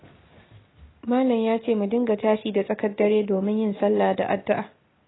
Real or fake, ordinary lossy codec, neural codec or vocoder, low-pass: real; AAC, 16 kbps; none; 7.2 kHz